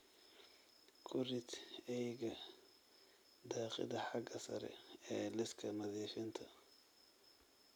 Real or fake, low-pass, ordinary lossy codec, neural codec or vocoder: real; none; none; none